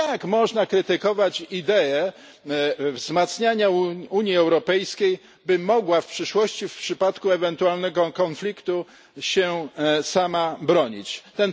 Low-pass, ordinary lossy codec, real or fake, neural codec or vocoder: none; none; real; none